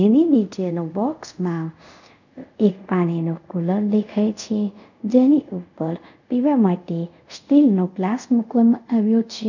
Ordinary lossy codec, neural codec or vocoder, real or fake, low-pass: none; codec, 24 kHz, 0.5 kbps, DualCodec; fake; 7.2 kHz